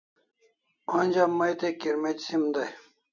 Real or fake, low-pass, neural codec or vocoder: real; 7.2 kHz; none